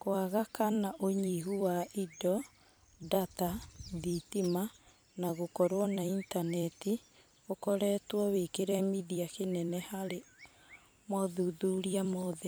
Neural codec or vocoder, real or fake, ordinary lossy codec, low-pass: vocoder, 44.1 kHz, 128 mel bands every 256 samples, BigVGAN v2; fake; none; none